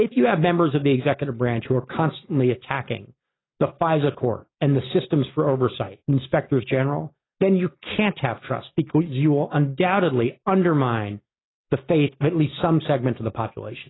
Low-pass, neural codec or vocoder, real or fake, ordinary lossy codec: 7.2 kHz; none; real; AAC, 16 kbps